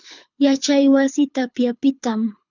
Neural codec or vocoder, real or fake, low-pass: codec, 24 kHz, 6 kbps, HILCodec; fake; 7.2 kHz